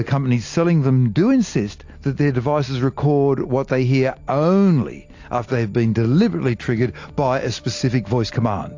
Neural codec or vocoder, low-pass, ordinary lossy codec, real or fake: none; 7.2 kHz; AAC, 48 kbps; real